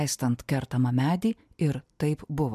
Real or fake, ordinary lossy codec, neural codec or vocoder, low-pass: real; MP3, 96 kbps; none; 14.4 kHz